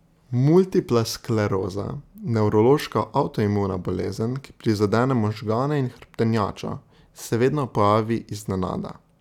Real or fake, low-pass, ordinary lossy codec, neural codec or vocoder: real; 19.8 kHz; none; none